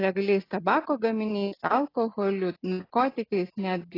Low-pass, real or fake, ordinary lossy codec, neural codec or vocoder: 5.4 kHz; real; AAC, 24 kbps; none